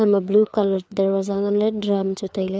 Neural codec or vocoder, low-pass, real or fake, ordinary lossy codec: codec, 16 kHz, 4 kbps, FreqCodec, larger model; none; fake; none